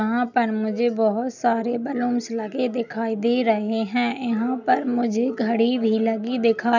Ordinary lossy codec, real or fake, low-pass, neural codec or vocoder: none; real; 7.2 kHz; none